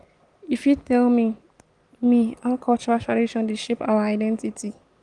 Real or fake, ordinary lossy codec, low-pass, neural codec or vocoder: real; Opus, 24 kbps; 10.8 kHz; none